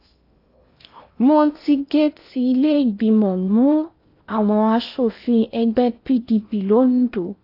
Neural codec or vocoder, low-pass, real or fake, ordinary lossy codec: codec, 16 kHz in and 24 kHz out, 0.8 kbps, FocalCodec, streaming, 65536 codes; 5.4 kHz; fake; AAC, 32 kbps